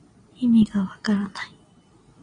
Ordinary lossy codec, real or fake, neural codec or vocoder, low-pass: AAC, 32 kbps; real; none; 9.9 kHz